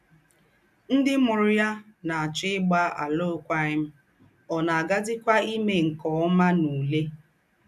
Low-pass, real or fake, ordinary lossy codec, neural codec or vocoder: 14.4 kHz; real; none; none